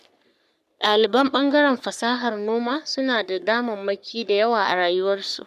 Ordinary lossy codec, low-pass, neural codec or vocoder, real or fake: none; 14.4 kHz; codec, 44.1 kHz, 3.4 kbps, Pupu-Codec; fake